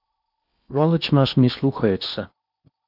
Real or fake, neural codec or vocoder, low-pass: fake; codec, 16 kHz in and 24 kHz out, 0.8 kbps, FocalCodec, streaming, 65536 codes; 5.4 kHz